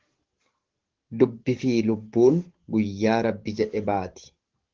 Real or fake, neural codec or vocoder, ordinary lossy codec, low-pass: fake; codec, 44.1 kHz, 7.8 kbps, DAC; Opus, 16 kbps; 7.2 kHz